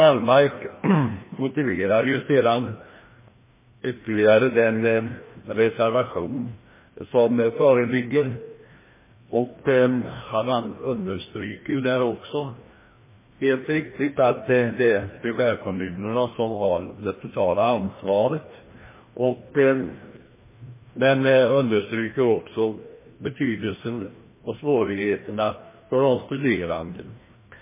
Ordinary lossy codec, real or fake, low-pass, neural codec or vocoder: MP3, 16 kbps; fake; 3.6 kHz; codec, 16 kHz, 1 kbps, FreqCodec, larger model